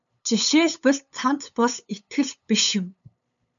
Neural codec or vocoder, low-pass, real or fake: codec, 16 kHz, 8 kbps, FunCodec, trained on LibriTTS, 25 frames a second; 7.2 kHz; fake